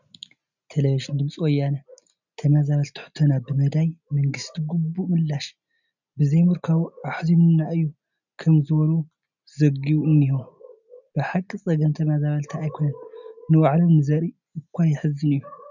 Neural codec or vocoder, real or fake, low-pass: none; real; 7.2 kHz